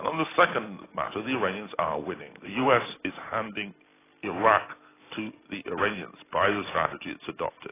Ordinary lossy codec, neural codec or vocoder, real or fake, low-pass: AAC, 16 kbps; none; real; 3.6 kHz